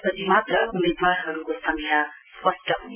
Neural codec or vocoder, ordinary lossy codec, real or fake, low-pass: none; none; real; 3.6 kHz